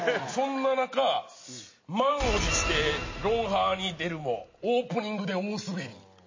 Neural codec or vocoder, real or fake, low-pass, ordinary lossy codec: none; real; 7.2 kHz; MP3, 32 kbps